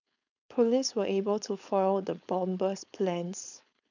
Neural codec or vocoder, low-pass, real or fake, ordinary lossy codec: codec, 16 kHz, 4.8 kbps, FACodec; 7.2 kHz; fake; none